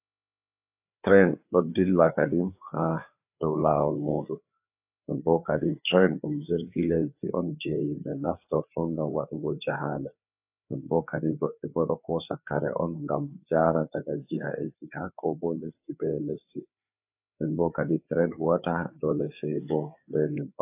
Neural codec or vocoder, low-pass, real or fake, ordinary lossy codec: codec, 16 kHz, 4 kbps, FreqCodec, larger model; 3.6 kHz; fake; AAC, 32 kbps